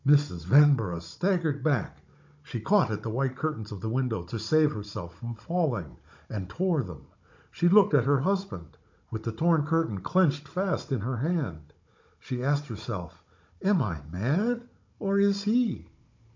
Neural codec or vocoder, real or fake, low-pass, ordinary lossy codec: codec, 16 kHz, 16 kbps, FunCodec, trained on Chinese and English, 50 frames a second; fake; 7.2 kHz; MP3, 48 kbps